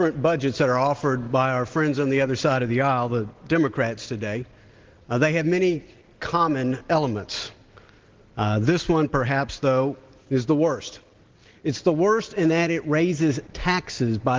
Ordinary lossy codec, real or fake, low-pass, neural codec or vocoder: Opus, 16 kbps; real; 7.2 kHz; none